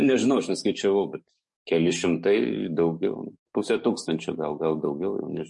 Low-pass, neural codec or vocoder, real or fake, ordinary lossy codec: 10.8 kHz; codec, 44.1 kHz, 7.8 kbps, DAC; fake; MP3, 48 kbps